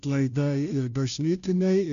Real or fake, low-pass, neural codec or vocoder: fake; 7.2 kHz; codec, 16 kHz, 0.5 kbps, FunCodec, trained on Chinese and English, 25 frames a second